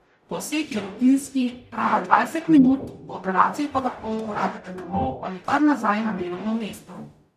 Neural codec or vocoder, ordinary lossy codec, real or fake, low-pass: codec, 44.1 kHz, 0.9 kbps, DAC; none; fake; 14.4 kHz